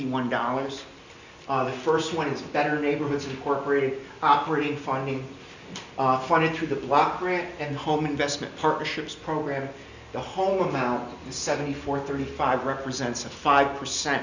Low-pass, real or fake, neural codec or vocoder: 7.2 kHz; real; none